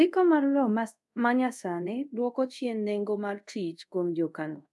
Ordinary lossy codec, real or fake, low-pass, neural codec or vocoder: none; fake; none; codec, 24 kHz, 0.5 kbps, DualCodec